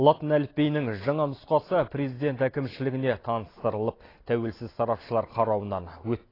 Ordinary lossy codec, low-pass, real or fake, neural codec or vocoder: AAC, 24 kbps; 5.4 kHz; real; none